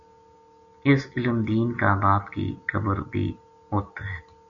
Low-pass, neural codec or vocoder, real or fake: 7.2 kHz; none; real